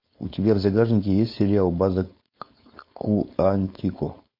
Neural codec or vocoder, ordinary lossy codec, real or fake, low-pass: codec, 16 kHz, 4.8 kbps, FACodec; MP3, 32 kbps; fake; 5.4 kHz